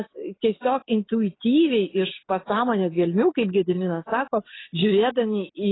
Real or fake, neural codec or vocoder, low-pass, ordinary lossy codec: real; none; 7.2 kHz; AAC, 16 kbps